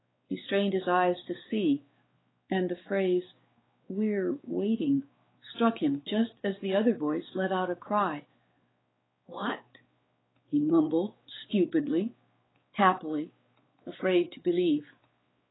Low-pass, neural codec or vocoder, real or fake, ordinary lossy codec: 7.2 kHz; codec, 16 kHz, 4 kbps, X-Codec, WavLM features, trained on Multilingual LibriSpeech; fake; AAC, 16 kbps